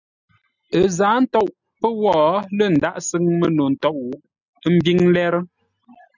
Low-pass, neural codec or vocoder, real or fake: 7.2 kHz; none; real